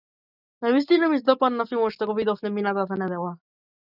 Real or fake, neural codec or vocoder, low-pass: real; none; 5.4 kHz